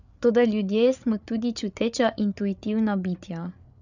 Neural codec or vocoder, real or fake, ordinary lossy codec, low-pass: codec, 16 kHz, 8 kbps, FreqCodec, larger model; fake; none; 7.2 kHz